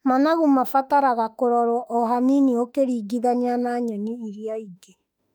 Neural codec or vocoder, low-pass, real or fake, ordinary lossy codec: autoencoder, 48 kHz, 32 numbers a frame, DAC-VAE, trained on Japanese speech; 19.8 kHz; fake; none